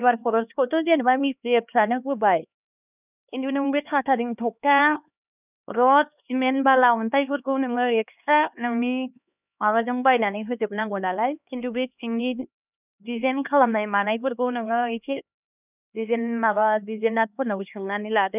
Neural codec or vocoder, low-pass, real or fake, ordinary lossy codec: codec, 16 kHz, 2 kbps, X-Codec, HuBERT features, trained on LibriSpeech; 3.6 kHz; fake; none